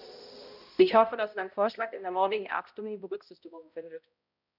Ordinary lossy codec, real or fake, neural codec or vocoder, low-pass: none; fake; codec, 16 kHz, 0.5 kbps, X-Codec, HuBERT features, trained on balanced general audio; 5.4 kHz